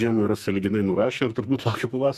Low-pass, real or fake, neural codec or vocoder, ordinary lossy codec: 14.4 kHz; fake; codec, 44.1 kHz, 2.6 kbps, SNAC; Opus, 64 kbps